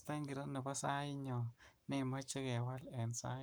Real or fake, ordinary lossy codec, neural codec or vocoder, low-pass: fake; none; codec, 44.1 kHz, 7.8 kbps, Pupu-Codec; none